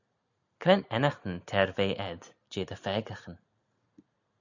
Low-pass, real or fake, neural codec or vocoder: 7.2 kHz; real; none